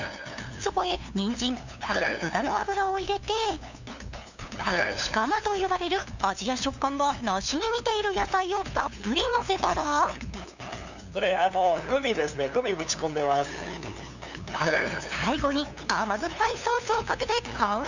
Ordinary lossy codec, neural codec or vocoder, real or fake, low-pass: none; codec, 16 kHz, 2 kbps, FunCodec, trained on LibriTTS, 25 frames a second; fake; 7.2 kHz